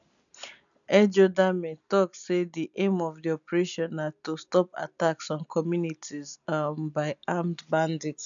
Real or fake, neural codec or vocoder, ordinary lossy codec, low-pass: real; none; none; 7.2 kHz